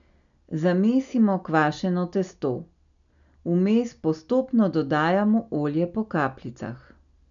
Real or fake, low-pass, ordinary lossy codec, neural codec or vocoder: real; 7.2 kHz; none; none